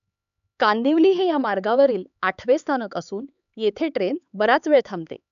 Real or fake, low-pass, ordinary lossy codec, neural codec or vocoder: fake; 7.2 kHz; none; codec, 16 kHz, 4 kbps, X-Codec, HuBERT features, trained on LibriSpeech